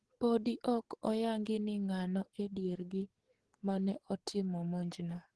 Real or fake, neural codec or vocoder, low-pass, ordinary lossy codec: fake; codec, 44.1 kHz, 7.8 kbps, DAC; 10.8 kHz; Opus, 16 kbps